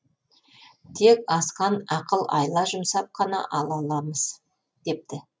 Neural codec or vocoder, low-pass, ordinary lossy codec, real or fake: none; none; none; real